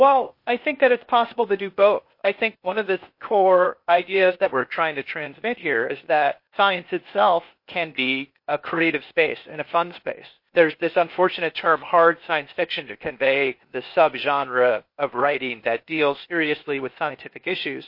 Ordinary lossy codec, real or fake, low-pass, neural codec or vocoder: MP3, 32 kbps; fake; 5.4 kHz; codec, 16 kHz, 0.8 kbps, ZipCodec